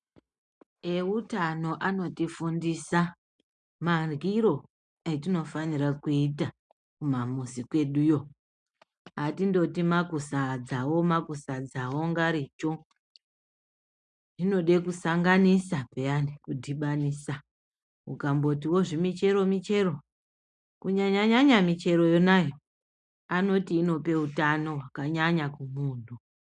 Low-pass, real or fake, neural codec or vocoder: 9.9 kHz; real; none